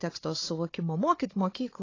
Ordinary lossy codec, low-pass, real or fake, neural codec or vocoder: AAC, 32 kbps; 7.2 kHz; fake; codec, 16 kHz, 4 kbps, FunCodec, trained on Chinese and English, 50 frames a second